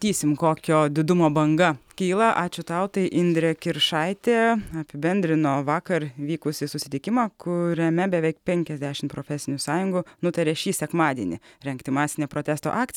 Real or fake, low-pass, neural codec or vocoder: real; 19.8 kHz; none